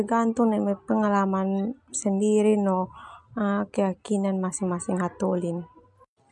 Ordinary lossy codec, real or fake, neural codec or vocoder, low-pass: MP3, 96 kbps; real; none; 10.8 kHz